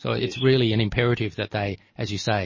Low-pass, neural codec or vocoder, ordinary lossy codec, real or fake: 7.2 kHz; none; MP3, 32 kbps; real